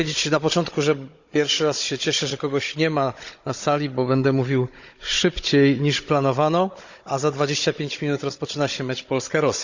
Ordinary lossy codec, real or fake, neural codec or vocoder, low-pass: Opus, 64 kbps; fake; codec, 16 kHz, 16 kbps, FunCodec, trained on Chinese and English, 50 frames a second; 7.2 kHz